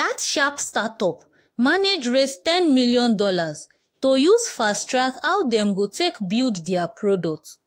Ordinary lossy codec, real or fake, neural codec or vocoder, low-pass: AAC, 48 kbps; fake; autoencoder, 48 kHz, 32 numbers a frame, DAC-VAE, trained on Japanese speech; 19.8 kHz